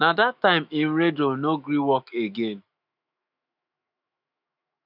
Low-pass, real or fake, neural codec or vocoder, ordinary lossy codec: 5.4 kHz; fake; autoencoder, 48 kHz, 128 numbers a frame, DAC-VAE, trained on Japanese speech; none